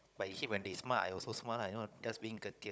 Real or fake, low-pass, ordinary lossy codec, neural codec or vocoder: fake; none; none; codec, 16 kHz, 16 kbps, FunCodec, trained on Chinese and English, 50 frames a second